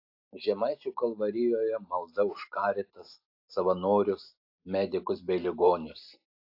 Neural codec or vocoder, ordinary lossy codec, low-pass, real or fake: none; AAC, 32 kbps; 5.4 kHz; real